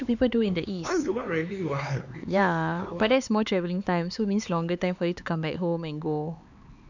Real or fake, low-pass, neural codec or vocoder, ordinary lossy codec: fake; 7.2 kHz; codec, 16 kHz, 4 kbps, X-Codec, HuBERT features, trained on LibriSpeech; none